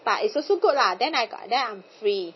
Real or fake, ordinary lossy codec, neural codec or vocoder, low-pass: real; MP3, 24 kbps; none; 7.2 kHz